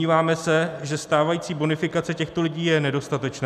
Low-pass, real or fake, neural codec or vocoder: 14.4 kHz; real; none